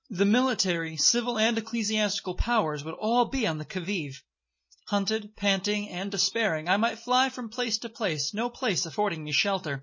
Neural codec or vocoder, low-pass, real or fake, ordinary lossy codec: none; 7.2 kHz; real; MP3, 32 kbps